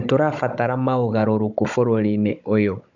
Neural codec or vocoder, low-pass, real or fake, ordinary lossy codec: codec, 16 kHz, 16 kbps, FunCodec, trained on Chinese and English, 50 frames a second; 7.2 kHz; fake; none